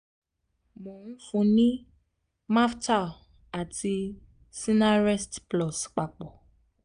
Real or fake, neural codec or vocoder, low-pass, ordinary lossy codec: real; none; 9.9 kHz; none